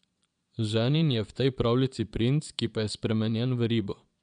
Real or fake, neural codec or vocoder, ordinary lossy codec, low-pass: fake; vocoder, 22.05 kHz, 80 mel bands, Vocos; none; 9.9 kHz